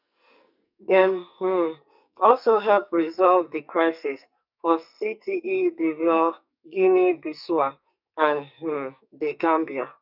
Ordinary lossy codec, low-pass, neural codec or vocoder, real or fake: none; 5.4 kHz; codec, 44.1 kHz, 2.6 kbps, SNAC; fake